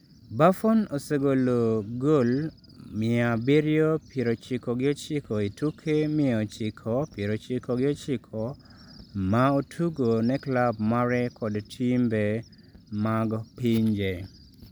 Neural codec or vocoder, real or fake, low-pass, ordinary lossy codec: none; real; none; none